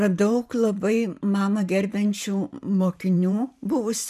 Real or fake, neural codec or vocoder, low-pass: fake; vocoder, 44.1 kHz, 128 mel bands every 512 samples, BigVGAN v2; 14.4 kHz